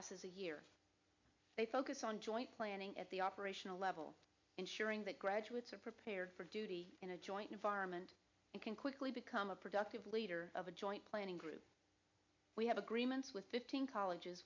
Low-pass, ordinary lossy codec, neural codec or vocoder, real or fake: 7.2 kHz; MP3, 64 kbps; none; real